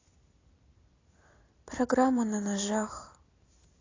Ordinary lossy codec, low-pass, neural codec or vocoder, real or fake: AAC, 32 kbps; 7.2 kHz; vocoder, 44.1 kHz, 128 mel bands every 256 samples, BigVGAN v2; fake